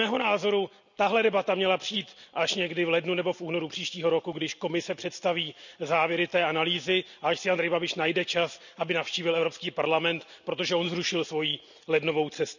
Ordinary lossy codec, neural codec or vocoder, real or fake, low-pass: none; none; real; 7.2 kHz